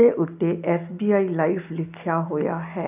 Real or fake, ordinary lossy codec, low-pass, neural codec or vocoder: real; none; 3.6 kHz; none